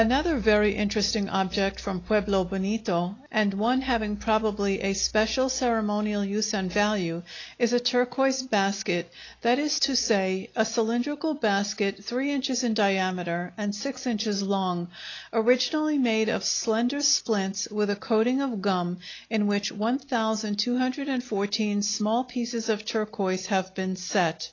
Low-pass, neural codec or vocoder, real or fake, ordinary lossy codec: 7.2 kHz; none; real; AAC, 32 kbps